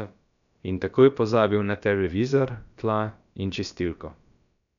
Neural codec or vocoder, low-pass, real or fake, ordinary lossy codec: codec, 16 kHz, about 1 kbps, DyCAST, with the encoder's durations; 7.2 kHz; fake; none